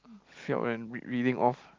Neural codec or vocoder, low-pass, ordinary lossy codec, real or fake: none; 7.2 kHz; Opus, 24 kbps; real